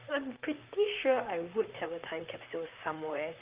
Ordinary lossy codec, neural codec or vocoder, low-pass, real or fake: Opus, 24 kbps; vocoder, 44.1 kHz, 128 mel bands, Pupu-Vocoder; 3.6 kHz; fake